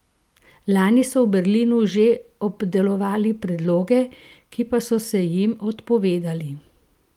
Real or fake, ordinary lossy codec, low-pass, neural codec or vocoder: real; Opus, 32 kbps; 19.8 kHz; none